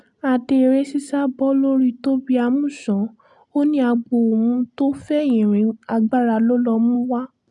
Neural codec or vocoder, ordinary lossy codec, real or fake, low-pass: none; none; real; 10.8 kHz